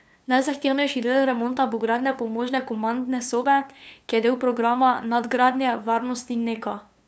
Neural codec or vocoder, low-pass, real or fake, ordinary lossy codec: codec, 16 kHz, 2 kbps, FunCodec, trained on LibriTTS, 25 frames a second; none; fake; none